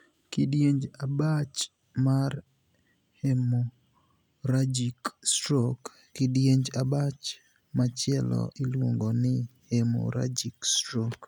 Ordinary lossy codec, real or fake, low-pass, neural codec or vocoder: none; real; 19.8 kHz; none